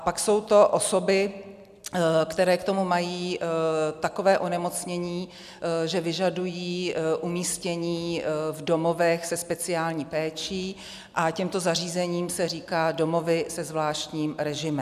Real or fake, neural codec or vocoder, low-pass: real; none; 14.4 kHz